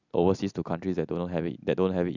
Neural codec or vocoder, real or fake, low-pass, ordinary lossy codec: none; real; 7.2 kHz; none